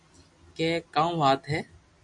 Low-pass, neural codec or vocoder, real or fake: 10.8 kHz; none; real